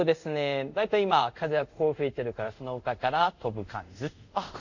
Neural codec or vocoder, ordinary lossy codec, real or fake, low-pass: codec, 24 kHz, 0.5 kbps, DualCodec; none; fake; 7.2 kHz